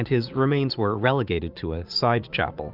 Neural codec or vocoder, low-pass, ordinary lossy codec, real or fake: vocoder, 44.1 kHz, 80 mel bands, Vocos; 5.4 kHz; Opus, 64 kbps; fake